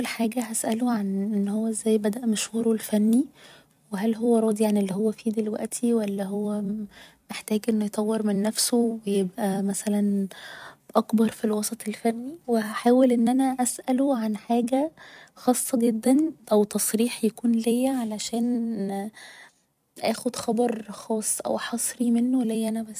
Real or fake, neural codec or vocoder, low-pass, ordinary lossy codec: fake; vocoder, 44.1 kHz, 128 mel bands every 256 samples, BigVGAN v2; 14.4 kHz; none